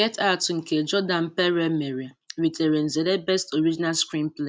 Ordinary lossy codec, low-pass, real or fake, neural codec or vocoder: none; none; real; none